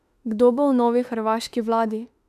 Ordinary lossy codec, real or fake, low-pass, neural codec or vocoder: none; fake; 14.4 kHz; autoencoder, 48 kHz, 32 numbers a frame, DAC-VAE, trained on Japanese speech